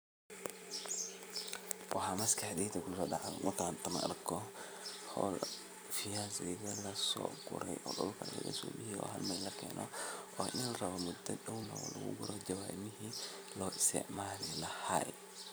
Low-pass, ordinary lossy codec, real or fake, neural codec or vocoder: none; none; fake; vocoder, 44.1 kHz, 128 mel bands every 256 samples, BigVGAN v2